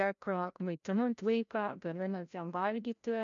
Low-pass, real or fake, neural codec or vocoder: 7.2 kHz; fake; codec, 16 kHz, 0.5 kbps, FreqCodec, larger model